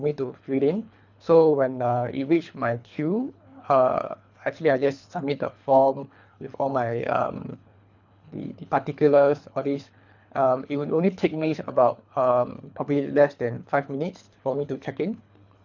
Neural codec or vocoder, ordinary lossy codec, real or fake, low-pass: codec, 24 kHz, 3 kbps, HILCodec; none; fake; 7.2 kHz